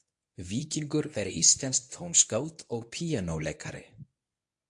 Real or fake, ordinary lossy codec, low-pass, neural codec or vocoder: fake; AAC, 64 kbps; 10.8 kHz; codec, 24 kHz, 0.9 kbps, WavTokenizer, medium speech release version 1